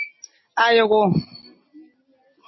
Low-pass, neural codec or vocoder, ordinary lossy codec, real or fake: 7.2 kHz; none; MP3, 24 kbps; real